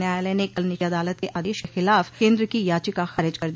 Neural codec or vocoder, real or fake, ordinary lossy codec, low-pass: none; real; none; none